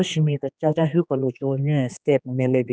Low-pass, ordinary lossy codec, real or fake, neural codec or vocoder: none; none; fake; codec, 16 kHz, 2 kbps, X-Codec, HuBERT features, trained on general audio